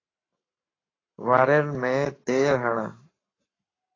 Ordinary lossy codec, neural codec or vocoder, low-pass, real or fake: AAC, 32 kbps; codec, 44.1 kHz, 7.8 kbps, Pupu-Codec; 7.2 kHz; fake